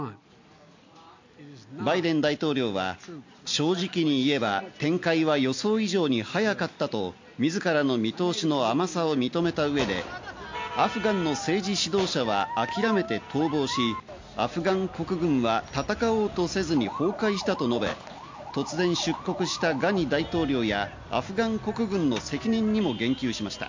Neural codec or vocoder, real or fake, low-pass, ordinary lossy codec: none; real; 7.2 kHz; MP3, 48 kbps